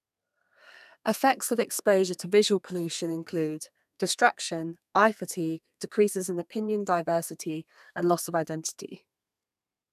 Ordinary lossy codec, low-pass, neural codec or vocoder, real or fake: none; 14.4 kHz; codec, 32 kHz, 1.9 kbps, SNAC; fake